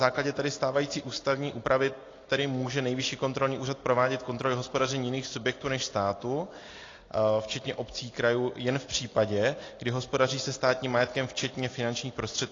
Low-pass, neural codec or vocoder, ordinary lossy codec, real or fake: 7.2 kHz; none; AAC, 32 kbps; real